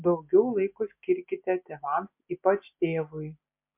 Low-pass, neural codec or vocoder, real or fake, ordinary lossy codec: 3.6 kHz; none; real; MP3, 32 kbps